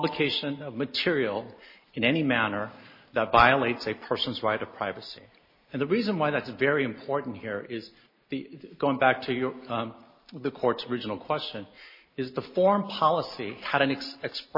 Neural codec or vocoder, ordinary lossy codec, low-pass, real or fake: none; MP3, 24 kbps; 5.4 kHz; real